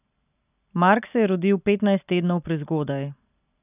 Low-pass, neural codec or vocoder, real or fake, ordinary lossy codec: 3.6 kHz; none; real; none